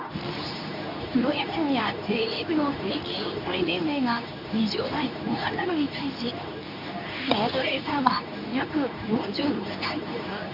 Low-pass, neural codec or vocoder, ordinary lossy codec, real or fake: 5.4 kHz; codec, 24 kHz, 0.9 kbps, WavTokenizer, medium speech release version 2; none; fake